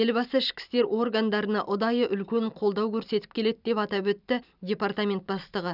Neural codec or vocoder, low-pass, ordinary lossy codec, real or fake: vocoder, 44.1 kHz, 128 mel bands every 256 samples, BigVGAN v2; 5.4 kHz; none; fake